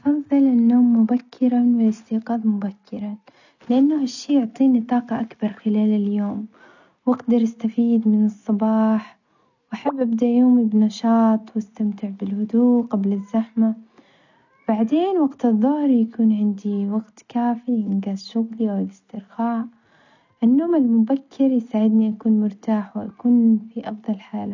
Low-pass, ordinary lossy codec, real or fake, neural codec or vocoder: 7.2 kHz; none; real; none